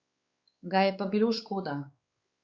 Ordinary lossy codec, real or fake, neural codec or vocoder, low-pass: Opus, 64 kbps; fake; codec, 16 kHz, 4 kbps, X-Codec, WavLM features, trained on Multilingual LibriSpeech; 7.2 kHz